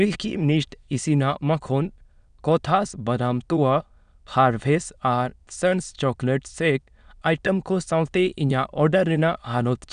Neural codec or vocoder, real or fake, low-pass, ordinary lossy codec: autoencoder, 22.05 kHz, a latent of 192 numbers a frame, VITS, trained on many speakers; fake; 9.9 kHz; none